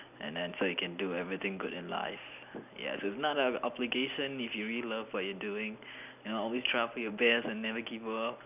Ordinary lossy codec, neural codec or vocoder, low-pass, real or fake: none; none; 3.6 kHz; real